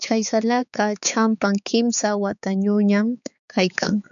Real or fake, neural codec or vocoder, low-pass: fake; codec, 16 kHz, 4 kbps, X-Codec, HuBERT features, trained on balanced general audio; 7.2 kHz